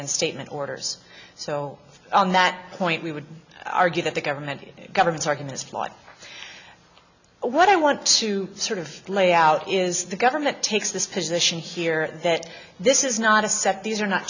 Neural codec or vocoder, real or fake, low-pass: none; real; 7.2 kHz